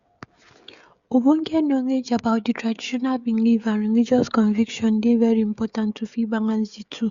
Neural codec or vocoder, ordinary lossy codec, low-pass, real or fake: codec, 16 kHz, 16 kbps, FreqCodec, smaller model; Opus, 64 kbps; 7.2 kHz; fake